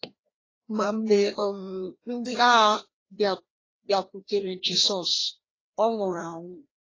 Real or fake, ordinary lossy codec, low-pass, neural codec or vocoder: fake; AAC, 32 kbps; 7.2 kHz; codec, 16 kHz, 1 kbps, FreqCodec, larger model